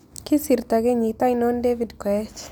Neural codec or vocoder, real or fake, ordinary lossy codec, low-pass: none; real; none; none